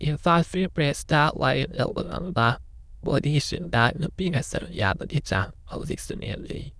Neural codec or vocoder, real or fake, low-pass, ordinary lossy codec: autoencoder, 22.05 kHz, a latent of 192 numbers a frame, VITS, trained on many speakers; fake; none; none